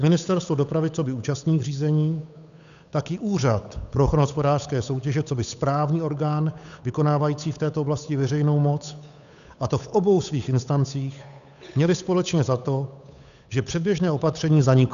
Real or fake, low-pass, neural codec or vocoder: fake; 7.2 kHz; codec, 16 kHz, 8 kbps, FunCodec, trained on Chinese and English, 25 frames a second